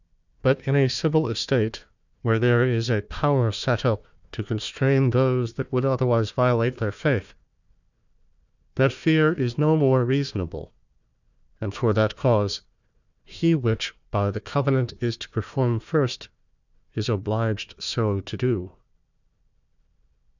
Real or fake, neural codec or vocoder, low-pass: fake; codec, 16 kHz, 1 kbps, FunCodec, trained on Chinese and English, 50 frames a second; 7.2 kHz